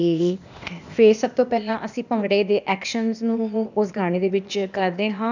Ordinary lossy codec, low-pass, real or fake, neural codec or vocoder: none; 7.2 kHz; fake; codec, 16 kHz, 0.8 kbps, ZipCodec